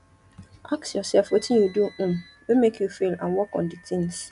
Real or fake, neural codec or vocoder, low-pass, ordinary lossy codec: real; none; 10.8 kHz; none